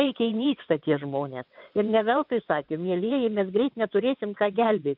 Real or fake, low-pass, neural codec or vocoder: fake; 5.4 kHz; vocoder, 22.05 kHz, 80 mel bands, WaveNeXt